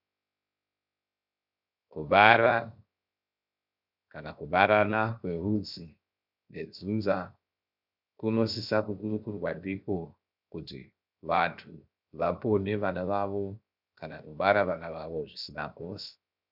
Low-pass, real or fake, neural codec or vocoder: 5.4 kHz; fake; codec, 16 kHz, 0.7 kbps, FocalCodec